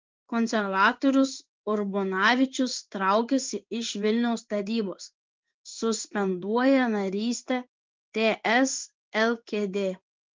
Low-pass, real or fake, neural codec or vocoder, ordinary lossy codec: 7.2 kHz; fake; codec, 16 kHz in and 24 kHz out, 1 kbps, XY-Tokenizer; Opus, 32 kbps